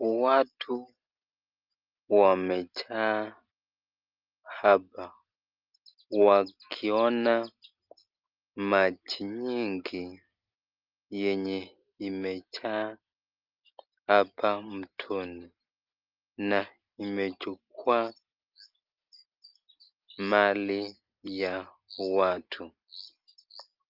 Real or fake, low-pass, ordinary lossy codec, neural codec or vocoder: real; 5.4 kHz; Opus, 24 kbps; none